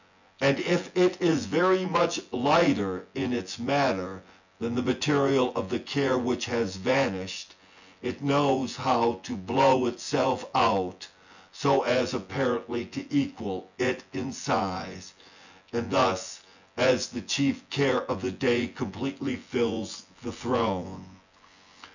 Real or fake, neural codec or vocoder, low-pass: fake; vocoder, 24 kHz, 100 mel bands, Vocos; 7.2 kHz